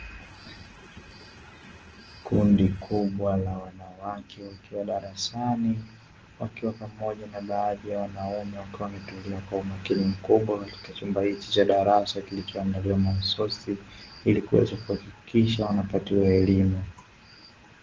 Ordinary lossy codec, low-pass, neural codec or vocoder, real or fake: Opus, 16 kbps; 7.2 kHz; none; real